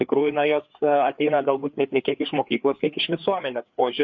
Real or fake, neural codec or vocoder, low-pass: fake; codec, 16 kHz, 4 kbps, FreqCodec, larger model; 7.2 kHz